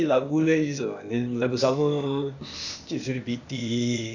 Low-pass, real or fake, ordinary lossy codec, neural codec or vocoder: 7.2 kHz; fake; none; codec, 16 kHz, 0.8 kbps, ZipCodec